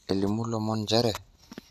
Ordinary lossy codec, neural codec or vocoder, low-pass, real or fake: none; none; 14.4 kHz; real